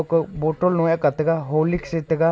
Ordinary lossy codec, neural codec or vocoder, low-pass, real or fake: none; none; none; real